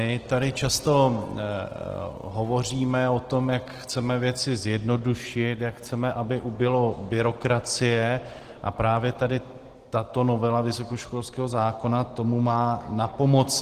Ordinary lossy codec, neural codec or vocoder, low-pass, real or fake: Opus, 16 kbps; none; 14.4 kHz; real